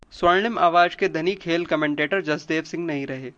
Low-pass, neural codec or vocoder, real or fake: 9.9 kHz; none; real